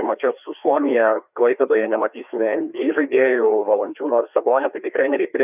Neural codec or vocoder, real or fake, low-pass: codec, 16 kHz, 2 kbps, FreqCodec, larger model; fake; 3.6 kHz